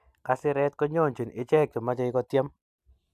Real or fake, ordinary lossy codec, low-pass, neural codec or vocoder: real; AAC, 96 kbps; 14.4 kHz; none